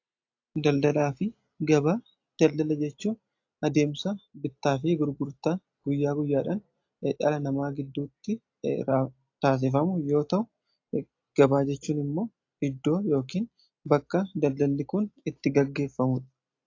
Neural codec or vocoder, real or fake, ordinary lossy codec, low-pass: none; real; AAC, 48 kbps; 7.2 kHz